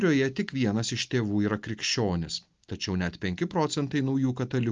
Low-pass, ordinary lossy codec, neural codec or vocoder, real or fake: 7.2 kHz; Opus, 24 kbps; none; real